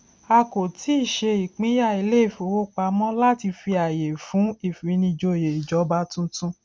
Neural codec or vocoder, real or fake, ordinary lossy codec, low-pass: none; real; none; none